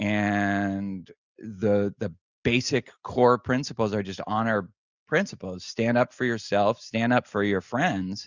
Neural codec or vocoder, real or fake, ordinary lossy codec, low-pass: none; real; Opus, 64 kbps; 7.2 kHz